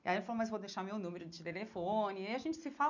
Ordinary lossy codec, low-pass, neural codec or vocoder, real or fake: none; 7.2 kHz; none; real